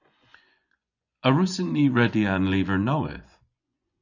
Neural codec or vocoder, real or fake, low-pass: vocoder, 24 kHz, 100 mel bands, Vocos; fake; 7.2 kHz